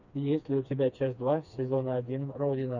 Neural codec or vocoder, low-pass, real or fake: codec, 16 kHz, 2 kbps, FreqCodec, smaller model; 7.2 kHz; fake